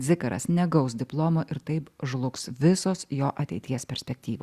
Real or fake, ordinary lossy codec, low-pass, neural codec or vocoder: real; Opus, 64 kbps; 14.4 kHz; none